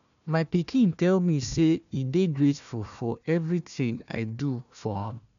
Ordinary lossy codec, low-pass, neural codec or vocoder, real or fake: none; 7.2 kHz; codec, 16 kHz, 1 kbps, FunCodec, trained on Chinese and English, 50 frames a second; fake